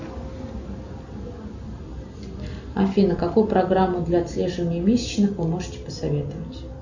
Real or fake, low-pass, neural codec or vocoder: real; 7.2 kHz; none